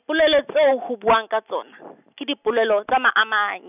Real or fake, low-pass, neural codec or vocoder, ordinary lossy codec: real; 3.6 kHz; none; none